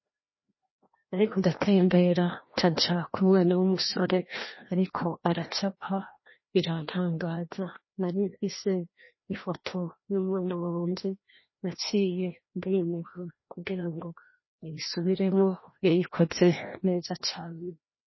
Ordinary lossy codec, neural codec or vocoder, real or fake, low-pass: MP3, 24 kbps; codec, 16 kHz, 1 kbps, FreqCodec, larger model; fake; 7.2 kHz